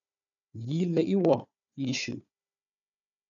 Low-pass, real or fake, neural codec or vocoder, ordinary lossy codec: 7.2 kHz; fake; codec, 16 kHz, 4 kbps, FunCodec, trained on Chinese and English, 50 frames a second; MP3, 96 kbps